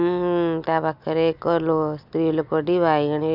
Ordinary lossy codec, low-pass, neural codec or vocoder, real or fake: none; 5.4 kHz; none; real